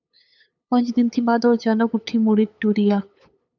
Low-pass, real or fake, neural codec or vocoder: 7.2 kHz; fake; codec, 16 kHz, 8 kbps, FunCodec, trained on LibriTTS, 25 frames a second